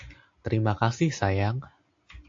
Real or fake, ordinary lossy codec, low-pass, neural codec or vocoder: real; AAC, 48 kbps; 7.2 kHz; none